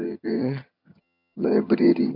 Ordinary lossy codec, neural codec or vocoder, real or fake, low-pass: none; vocoder, 22.05 kHz, 80 mel bands, HiFi-GAN; fake; 5.4 kHz